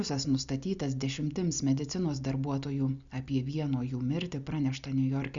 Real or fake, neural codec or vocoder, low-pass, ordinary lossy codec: real; none; 7.2 kHz; Opus, 64 kbps